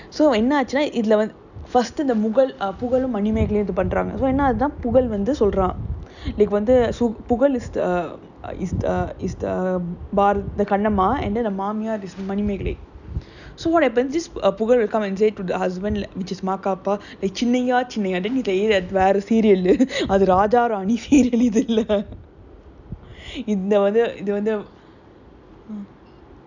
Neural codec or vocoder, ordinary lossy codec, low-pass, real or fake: none; none; 7.2 kHz; real